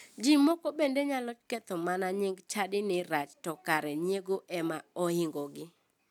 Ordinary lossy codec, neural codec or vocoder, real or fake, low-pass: none; none; real; 19.8 kHz